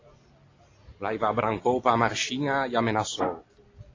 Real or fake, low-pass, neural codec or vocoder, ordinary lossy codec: real; 7.2 kHz; none; AAC, 32 kbps